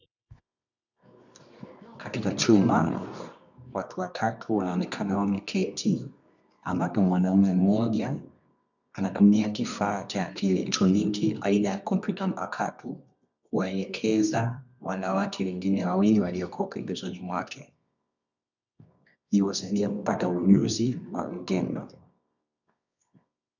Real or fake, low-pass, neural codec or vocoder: fake; 7.2 kHz; codec, 24 kHz, 0.9 kbps, WavTokenizer, medium music audio release